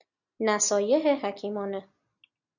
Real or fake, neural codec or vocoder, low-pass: real; none; 7.2 kHz